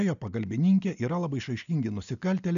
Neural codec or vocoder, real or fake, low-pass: none; real; 7.2 kHz